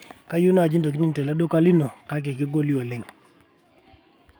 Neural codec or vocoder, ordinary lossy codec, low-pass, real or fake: codec, 44.1 kHz, 7.8 kbps, DAC; none; none; fake